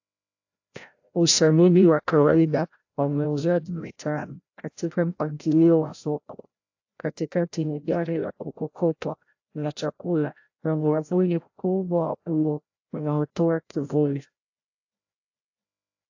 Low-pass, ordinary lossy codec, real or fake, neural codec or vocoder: 7.2 kHz; AAC, 48 kbps; fake; codec, 16 kHz, 0.5 kbps, FreqCodec, larger model